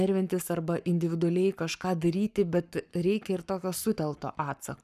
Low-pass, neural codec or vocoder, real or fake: 14.4 kHz; codec, 44.1 kHz, 7.8 kbps, Pupu-Codec; fake